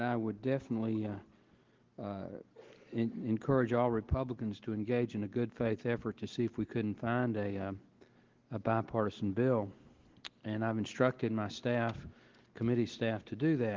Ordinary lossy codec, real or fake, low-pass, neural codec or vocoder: Opus, 16 kbps; real; 7.2 kHz; none